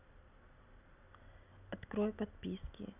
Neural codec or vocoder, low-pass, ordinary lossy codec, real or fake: none; 3.6 kHz; none; real